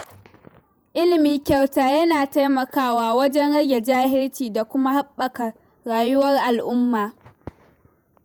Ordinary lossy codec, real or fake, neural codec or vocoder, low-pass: none; fake; vocoder, 48 kHz, 128 mel bands, Vocos; none